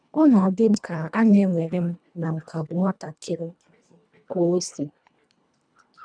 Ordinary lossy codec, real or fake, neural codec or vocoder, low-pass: none; fake; codec, 24 kHz, 1.5 kbps, HILCodec; 9.9 kHz